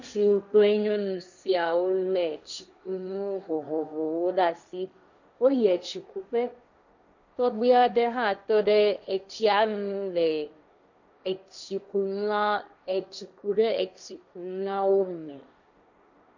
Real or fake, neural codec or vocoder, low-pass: fake; codec, 16 kHz, 1.1 kbps, Voila-Tokenizer; 7.2 kHz